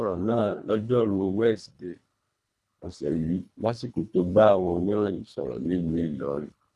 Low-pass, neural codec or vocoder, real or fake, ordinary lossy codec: none; codec, 24 kHz, 1.5 kbps, HILCodec; fake; none